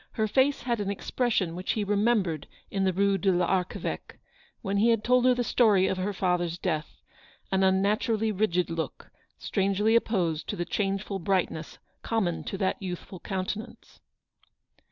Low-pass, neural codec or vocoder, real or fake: 7.2 kHz; none; real